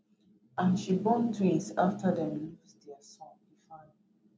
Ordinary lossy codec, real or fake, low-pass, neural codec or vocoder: none; real; none; none